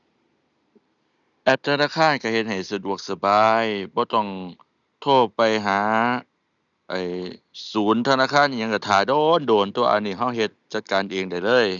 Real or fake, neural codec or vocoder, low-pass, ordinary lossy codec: real; none; 7.2 kHz; none